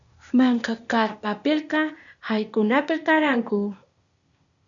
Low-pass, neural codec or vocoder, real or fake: 7.2 kHz; codec, 16 kHz, 0.8 kbps, ZipCodec; fake